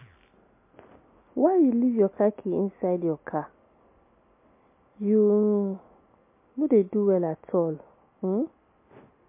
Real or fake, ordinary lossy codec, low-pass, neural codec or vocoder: real; MP3, 24 kbps; 3.6 kHz; none